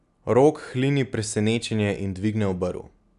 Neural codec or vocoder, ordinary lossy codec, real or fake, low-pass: none; none; real; 10.8 kHz